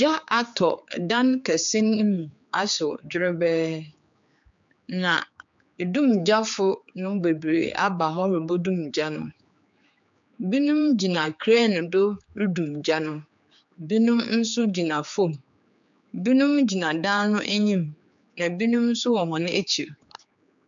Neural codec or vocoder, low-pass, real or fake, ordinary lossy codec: codec, 16 kHz, 4 kbps, X-Codec, HuBERT features, trained on general audio; 7.2 kHz; fake; MP3, 64 kbps